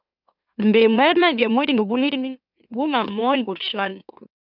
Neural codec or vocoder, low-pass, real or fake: autoencoder, 44.1 kHz, a latent of 192 numbers a frame, MeloTTS; 5.4 kHz; fake